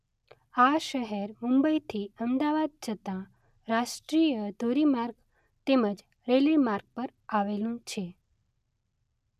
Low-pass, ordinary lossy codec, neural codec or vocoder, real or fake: 14.4 kHz; none; none; real